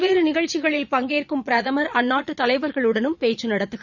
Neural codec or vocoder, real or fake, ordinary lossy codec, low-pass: vocoder, 22.05 kHz, 80 mel bands, Vocos; fake; none; 7.2 kHz